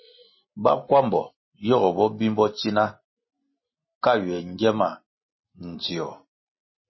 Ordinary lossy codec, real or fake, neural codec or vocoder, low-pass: MP3, 24 kbps; real; none; 7.2 kHz